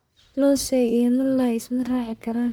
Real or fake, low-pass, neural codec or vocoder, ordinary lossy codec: fake; none; codec, 44.1 kHz, 1.7 kbps, Pupu-Codec; none